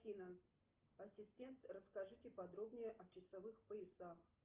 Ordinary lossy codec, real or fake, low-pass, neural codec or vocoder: Opus, 24 kbps; real; 3.6 kHz; none